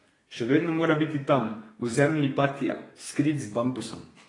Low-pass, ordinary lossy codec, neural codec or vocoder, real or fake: 10.8 kHz; AAC, 32 kbps; codec, 32 kHz, 1.9 kbps, SNAC; fake